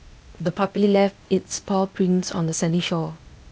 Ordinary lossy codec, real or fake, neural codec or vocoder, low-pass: none; fake; codec, 16 kHz, 0.8 kbps, ZipCodec; none